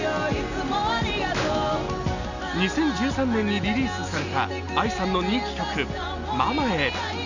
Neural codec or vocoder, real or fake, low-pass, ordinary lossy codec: none; real; 7.2 kHz; none